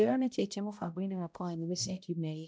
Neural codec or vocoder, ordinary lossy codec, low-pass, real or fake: codec, 16 kHz, 0.5 kbps, X-Codec, HuBERT features, trained on balanced general audio; none; none; fake